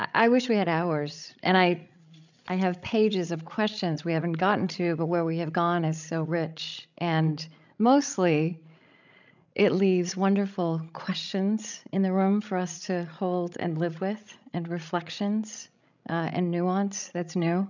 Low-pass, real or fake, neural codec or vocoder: 7.2 kHz; fake; codec, 16 kHz, 16 kbps, FreqCodec, larger model